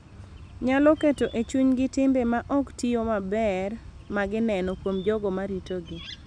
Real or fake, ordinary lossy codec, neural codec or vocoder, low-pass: real; none; none; 9.9 kHz